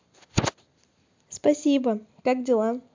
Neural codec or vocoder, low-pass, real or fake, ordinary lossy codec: none; 7.2 kHz; real; MP3, 64 kbps